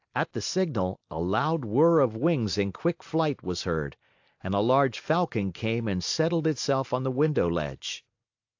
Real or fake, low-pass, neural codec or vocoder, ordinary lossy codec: real; 7.2 kHz; none; AAC, 48 kbps